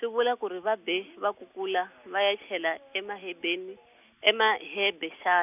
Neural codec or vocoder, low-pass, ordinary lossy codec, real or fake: none; 3.6 kHz; none; real